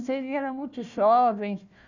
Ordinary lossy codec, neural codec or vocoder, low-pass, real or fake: none; codec, 16 kHz, 1 kbps, FunCodec, trained on Chinese and English, 50 frames a second; 7.2 kHz; fake